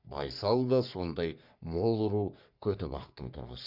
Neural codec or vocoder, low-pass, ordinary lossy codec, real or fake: codec, 44.1 kHz, 3.4 kbps, Pupu-Codec; 5.4 kHz; none; fake